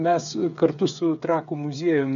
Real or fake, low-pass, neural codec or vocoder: fake; 7.2 kHz; codec, 16 kHz, 8 kbps, FreqCodec, smaller model